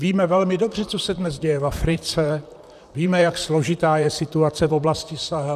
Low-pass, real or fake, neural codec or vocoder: 14.4 kHz; fake; vocoder, 44.1 kHz, 128 mel bands, Pupu-Vocoder